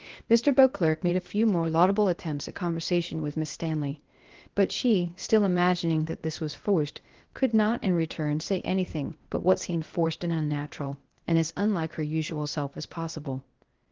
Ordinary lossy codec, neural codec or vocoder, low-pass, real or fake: Opus, 16 kbps; codec, 16 kHz, 0.8 kbps, ZipCodec; 7.2 kHz; fake